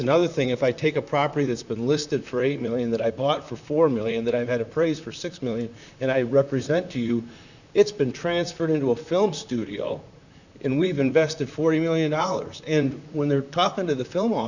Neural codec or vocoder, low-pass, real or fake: vocoder, 44.1 kHz, 128 mel bands, Pupu-Vocoder; 7.2 kHz; fake